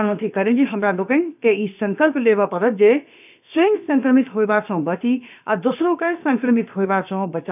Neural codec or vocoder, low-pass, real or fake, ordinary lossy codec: codec, 16 kHz, about 1 kbps, DyCAST, with the encoder's durations; 3.6 kHz; fake; none